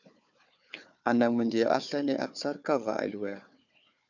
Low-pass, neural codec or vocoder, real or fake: 7.2 kHz; codec, 16 kHz, 4 kbps, FunCodec, trained on Chinese and English, 50 frames a second; fake